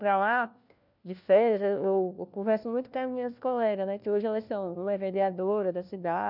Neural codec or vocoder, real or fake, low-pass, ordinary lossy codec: codec, 16 kHz, 1 kbps, FunCodec, trained on LibriTTS, 50 frames a second; fake; 5.4 kHz; none